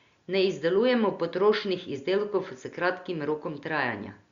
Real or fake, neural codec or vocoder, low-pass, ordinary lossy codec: real; none; 7.2 kHz; Opus, 64 kbps